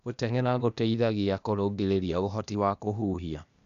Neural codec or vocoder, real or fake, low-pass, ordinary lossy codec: codec, 16 kHz, 0.8 kbps, ZipCodec; fake; 7.2 kHz; none